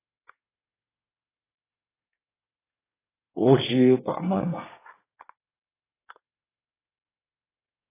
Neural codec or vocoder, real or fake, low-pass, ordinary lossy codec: codec, 24 kHz, 1 kbps, SNAC; fake; 3.6 kHz; AAC, 16 kbps